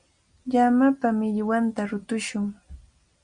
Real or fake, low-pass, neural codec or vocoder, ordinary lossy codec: real; 9.9 kHz; none; Opus, 64 kbps